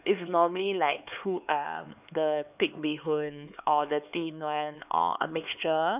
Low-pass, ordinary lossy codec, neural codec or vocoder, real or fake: 3.6 kHz; none; codec, 16 kHz, 4 kbps, X-Codec, HuBERT features, trained on LibriSpeech; fake